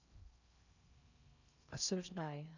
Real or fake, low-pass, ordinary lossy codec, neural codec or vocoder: fake; 7.2 kHz; none; codec, 16 kHz in and 24 kHz out, 0.6 kbps, FocalCodec, streaming, 4096 codes